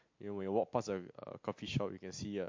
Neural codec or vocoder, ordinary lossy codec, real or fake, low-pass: none; MP3, 48 kbps; real; 7.2 kHz